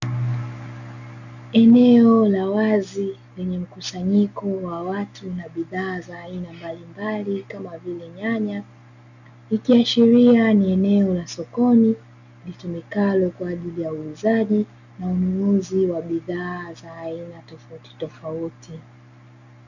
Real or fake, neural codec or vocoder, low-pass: real; none; 7.2 kHz